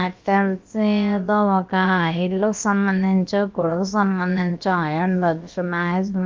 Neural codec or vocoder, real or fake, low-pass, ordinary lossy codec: codec, 16 kHz, about 1 kbps, DyCAST, with the encoder's durations; fake; 7.2 kHz; Opus, 32 kbps